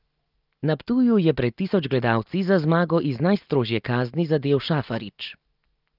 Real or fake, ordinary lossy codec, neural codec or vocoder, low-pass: real; Opus, 32 kbps; none; 5.4 kHz